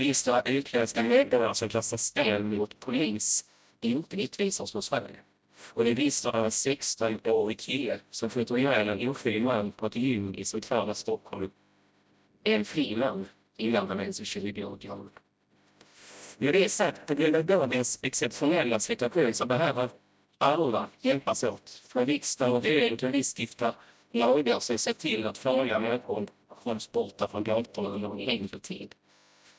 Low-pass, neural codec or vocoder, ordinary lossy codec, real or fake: none; codec, 16 kHz, 0.5 kbps, FreqCodec, smaller model; none; fake